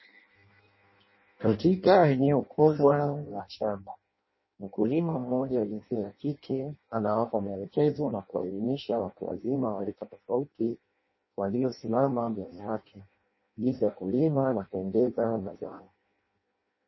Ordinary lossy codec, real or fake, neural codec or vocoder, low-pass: MP3, 24 kbps; fake; codec, 16 kHz in and 24 kHz out, 0.6 kbps, FireRedTTS-2 codec; 7.2 kHz